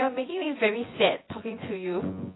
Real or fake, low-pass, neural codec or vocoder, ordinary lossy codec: fake; 7.2 kHz; vocoder, 24 kHz, 100 mel bands, Vocos; AAC, 16 kbps